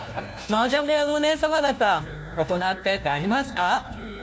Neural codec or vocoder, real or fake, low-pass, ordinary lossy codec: codec, 16 kHz, 1 kbps, FunCodec, trained on LibriTTS, 50 frames a second; fake; none; none